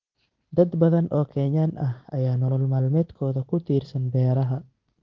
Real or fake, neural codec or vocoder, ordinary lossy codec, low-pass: real; none; Opus, 16 kbps; 7.2 kHz